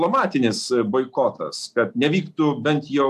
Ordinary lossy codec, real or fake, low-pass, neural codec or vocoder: AAC, 96 kbps; real; 14.4 kHz; none